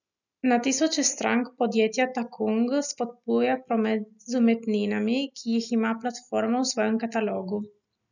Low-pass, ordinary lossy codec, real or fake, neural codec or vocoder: 7.2 kHz; none; real; none